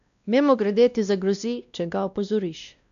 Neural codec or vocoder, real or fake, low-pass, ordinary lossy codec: codec, 16 kHz, 1 kbps, X-Codec, WavLM features, trained on Multilingual LibriSpeech; fake; 7.2 kHz; none